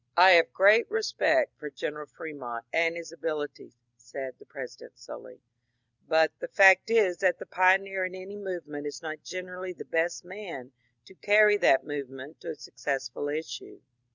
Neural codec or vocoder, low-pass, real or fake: none; 7.2 kHz; real